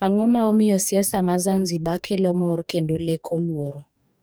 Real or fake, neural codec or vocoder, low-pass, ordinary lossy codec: fake; codec, 44.1 kHz, 2.6 kbps, DAC; none; none